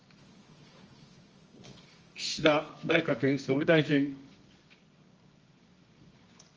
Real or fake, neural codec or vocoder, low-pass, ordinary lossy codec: fake; codec, 24 kHz, 0.9 kbps, WavTokenizer, medium music audio release; 7.2 kHz; Opus, 24 kbps